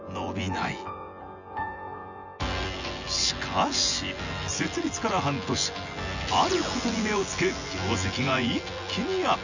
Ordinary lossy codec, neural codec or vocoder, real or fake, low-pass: none; vocoder, 24 kHz, 100 mel bands, Vocos; fake; 7.2 kHz